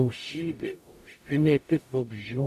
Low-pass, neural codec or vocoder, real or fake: 14.4 kHz; codec, 44.1 kHz, 0.9 kbps, DAC; fake